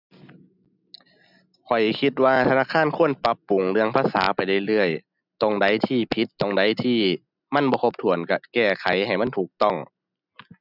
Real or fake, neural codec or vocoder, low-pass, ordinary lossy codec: real; none; 5.4 kHz; none